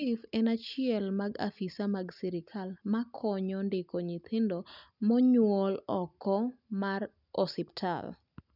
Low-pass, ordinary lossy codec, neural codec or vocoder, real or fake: 5.4 kHz; none; none; real